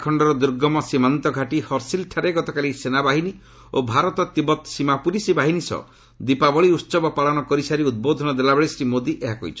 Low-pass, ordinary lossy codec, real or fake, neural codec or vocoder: none; none; real; none